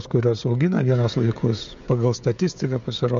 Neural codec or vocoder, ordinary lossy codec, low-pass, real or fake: codec, 16 kHz, 16 kbps, FreqCodec, smaller model; MP3, 48 kbps; 7.2 kHz; fake